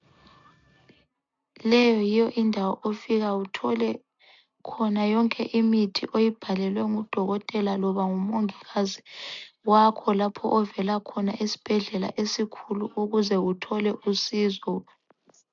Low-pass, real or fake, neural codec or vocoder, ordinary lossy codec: 7.2 kHz; real; none; MP3, 64 kbps